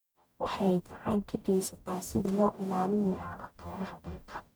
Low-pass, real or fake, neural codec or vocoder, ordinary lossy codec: none; fake; codec, 44.1 kHz, 0.9 kbps, DAC; none